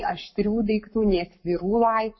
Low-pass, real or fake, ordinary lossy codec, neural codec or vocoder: 7.2 kHz; fake; MP3, 24 kbps; codec, 44.1 kHz, 7.8 kbps, Pupu-Codec